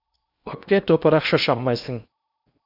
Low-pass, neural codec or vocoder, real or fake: 5.4 kHz; codec, 16 kHz in and 24 kHz out, 0.8 kbps, FocalCodec, streaming, 65536 codes; fake